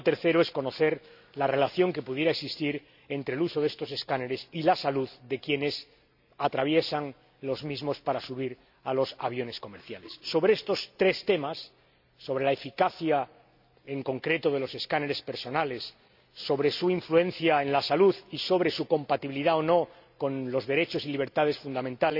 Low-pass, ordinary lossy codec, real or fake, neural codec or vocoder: 5.4 kHz; none; real; none